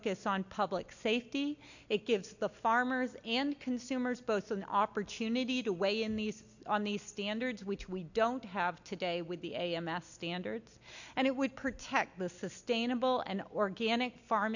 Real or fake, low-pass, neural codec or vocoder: real; 7.2 kHz; none